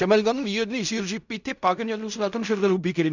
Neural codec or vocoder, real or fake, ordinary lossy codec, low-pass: codec, 16 kHz in and 24 kHz out, 0.4 kbps, LongCat-Audio-Codec, fine tuned four codebook decoder; fake; none; 7.2 kHz